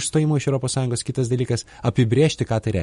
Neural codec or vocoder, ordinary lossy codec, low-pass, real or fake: none; MP3, 48 kbps; 19.8 kHz; real